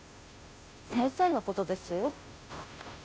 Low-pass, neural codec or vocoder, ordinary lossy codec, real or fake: none; codec, 16 kHz, 0.5 kbps, FunCodec, trained on Chinese and English, 25 frames a second; none; fake